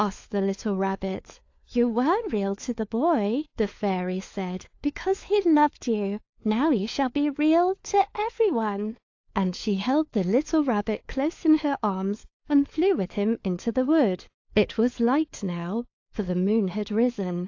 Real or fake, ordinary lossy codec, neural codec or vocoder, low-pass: fake; AAC, 48 kbps; codec, 16 kHz, 4 kbps, FunCodec, trained on LibriTTS, 50 frames a second; 7.2 kHz